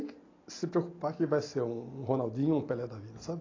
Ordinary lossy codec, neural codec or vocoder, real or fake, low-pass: AAC, 32 kbps; none; real; 7.2 kHz